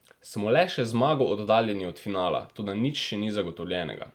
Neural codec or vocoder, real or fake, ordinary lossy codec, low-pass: none; real; Opus, 32 kbps; 19.8 kHz